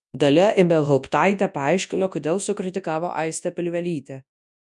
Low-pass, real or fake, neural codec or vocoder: 10.8 kHz; fake; codec, 24 kHz, 0.9 kbps, WavTokenizer, large speech release